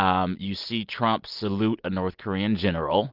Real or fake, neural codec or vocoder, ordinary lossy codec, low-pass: real; none; Opus, 24 kbps; 5.4 kHz